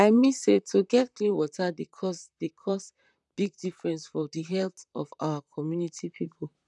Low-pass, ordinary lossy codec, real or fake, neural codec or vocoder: 10.8 kHz; none; fake; vocoder, 44.1 kHz, 128 mel bands, Pupu-Vocoder